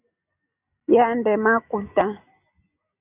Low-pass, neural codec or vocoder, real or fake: 3.6 kHz; none; real